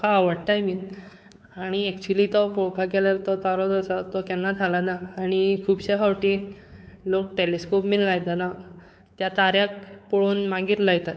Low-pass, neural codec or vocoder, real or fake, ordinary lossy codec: none; codec, 16 kHz, 4 kbps, X-Codec, WavLM features, trained on Multilingual LibriSpeech; fake; none